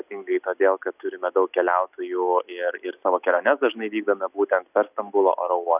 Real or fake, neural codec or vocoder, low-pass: real; none; 3.6 kHz